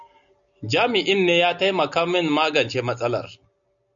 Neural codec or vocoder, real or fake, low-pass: none; real; 7.2 kHz